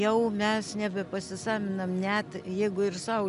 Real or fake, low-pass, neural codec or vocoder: real; 10.8 kHz; none